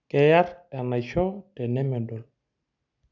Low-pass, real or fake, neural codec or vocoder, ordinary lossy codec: 7.2 kHz; real; none; none